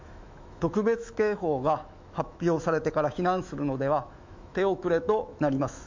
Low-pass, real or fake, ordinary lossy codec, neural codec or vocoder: 7.2 kHz; fake; MP3, 48 kbps; autoencoder, 48 kHz, 128 numbers a frame, DAC-VAE, trained on Japanese speech